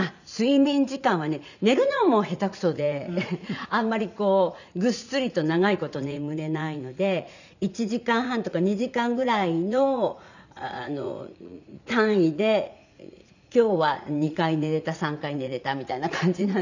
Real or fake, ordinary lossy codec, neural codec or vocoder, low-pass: fake; none; vocoder, 44.1 kHz, 80 mel bands, Vocos; 7.2 kHz